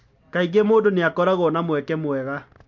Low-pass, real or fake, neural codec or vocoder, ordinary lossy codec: 7.2 kHz; real; none; MP3, 48 kbps